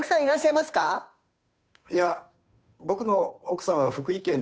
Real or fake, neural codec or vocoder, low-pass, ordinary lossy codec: fake; codec, 16 kHz, 2 kbps, FunCodec, trained on Chinese and English, 25 frames a second; none; none